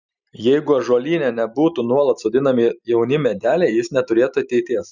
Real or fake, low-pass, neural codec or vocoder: real; 7.2 kHz; none